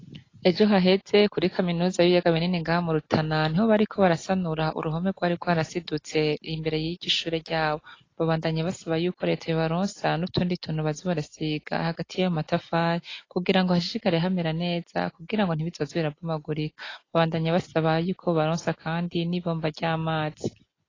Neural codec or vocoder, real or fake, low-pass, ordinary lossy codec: none; real; 7.2 kHz; AAC, 32 kbps